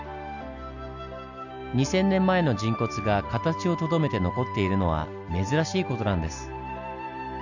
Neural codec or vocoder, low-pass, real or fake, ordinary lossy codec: none; 7.2 kHz; real; none